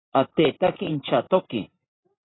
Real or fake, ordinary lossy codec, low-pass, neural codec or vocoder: fake; AAC, 16 kbps; 7.2 kHz; vocoder, 44.1 kHz, 128 mel bands every 512 samples, BigVGAN v2